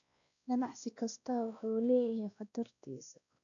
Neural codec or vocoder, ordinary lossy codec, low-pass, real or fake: codec, 16 kHz, 1 kbps, X-Codec, WavLM features, trained on Multilingual LibriSpeech; none; 7.2 kHz; fake